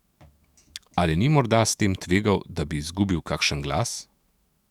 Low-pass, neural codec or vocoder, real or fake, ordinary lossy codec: 19.8 kHz; autoencoder, 48 kHz, 128 numbers a frame, DAC-VAE, trained on Japanese speech; fake; Opus, 64 kbps